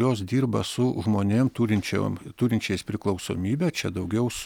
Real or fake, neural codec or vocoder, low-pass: real; none; 19.8 kHz